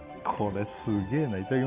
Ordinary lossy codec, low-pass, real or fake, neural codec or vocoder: Opus, 64 kbps; 3.6 kHz; real; none